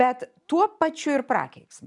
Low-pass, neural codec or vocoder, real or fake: 10.8 kHz; none; real